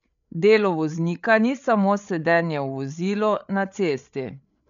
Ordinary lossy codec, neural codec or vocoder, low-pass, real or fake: none; codec, 16 kHz, 8 kbps, FreqCodec, larger model; 7.2 kHz; fake